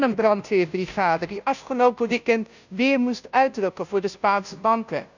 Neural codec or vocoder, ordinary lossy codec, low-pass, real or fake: codec, 16 kHz, 0.5 kbps, FunCodec, trained on Chinese and English, 25 frames a second; none; 7.2 kHz; fake